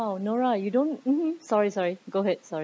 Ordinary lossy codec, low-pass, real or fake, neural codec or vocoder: none; none; real; none